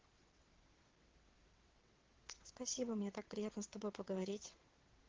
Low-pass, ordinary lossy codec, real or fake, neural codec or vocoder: 7.2 kHz; Opus, 16 kbps; fake; vocoder, 22.05 kHz, 80 mel bands, WaveNeXt